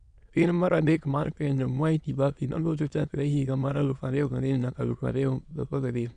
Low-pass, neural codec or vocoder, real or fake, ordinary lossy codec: 9.9 kHz; autoencoder, 22.05 kHz, a latent of 192 numbers a frame, VITS, trained on many speakers; fake; none